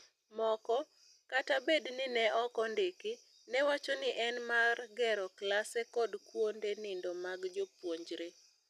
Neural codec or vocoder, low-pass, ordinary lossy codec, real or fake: none; 14.4 kHz; none; real